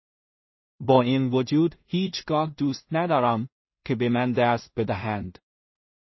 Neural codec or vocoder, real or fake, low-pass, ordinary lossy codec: codec, 16 kHz in and 24 kHz out, 0.4 kbps, LongCat-Audio-Codec, two codebook decoder; fake; 7.2 kHz; MP3, 24 kbps